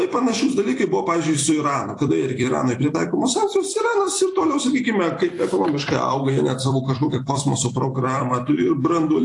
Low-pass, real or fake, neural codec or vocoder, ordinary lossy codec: 10.8 kHz; fake; vocoder, 44.1 kHz, 128 mel bands every 256 samples, BigVGAN v2; AAC, 48 kbps